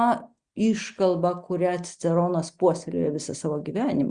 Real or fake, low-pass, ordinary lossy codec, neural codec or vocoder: real; 9.9 kHz; Opus, 64 kbps; none